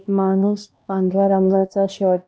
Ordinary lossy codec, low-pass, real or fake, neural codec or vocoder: none; none; fake; codec, 16 kHz, 1 kbps, X-Codec, HuBERT features, trained on LibriSpeech